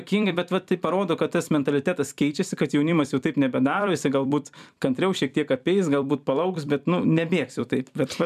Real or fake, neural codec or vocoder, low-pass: fake; vocoder, 44.1 kHz, 128 mel bands every 256 samples, BigVGAN v2; 14.4 kHz